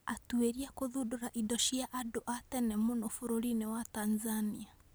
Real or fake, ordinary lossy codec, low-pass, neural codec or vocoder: real; none; none; none